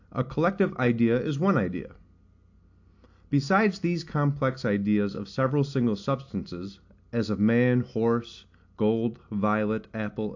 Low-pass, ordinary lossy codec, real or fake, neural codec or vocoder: 7.2 kHz; AAC, 48 kbps; real; none